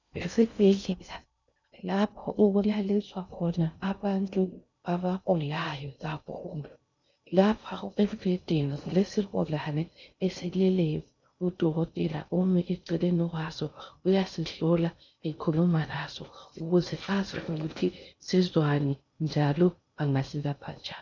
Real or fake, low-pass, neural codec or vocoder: fake; 7.2 kHz; codec, 16 kHz in and 24 kHz out, 0.6 kbps, FocalCodec, streaming, 2048 codes